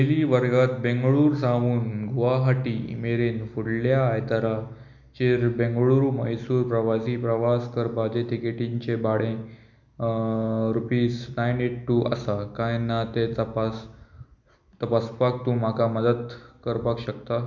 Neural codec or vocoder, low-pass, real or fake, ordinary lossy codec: none; 7.2 kHz; real; none